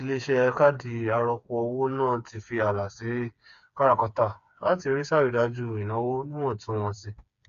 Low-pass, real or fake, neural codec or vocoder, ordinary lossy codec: 7.2 kHz; fake; codec, 16 kHz, 4 kbps, FreqCodec, smaller model; none